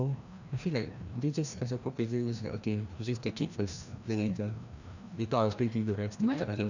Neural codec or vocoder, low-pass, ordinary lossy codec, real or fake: codec, 16 kHz, 1 kbps, FreqCodec, larger model; 7.2 kHz; none; fake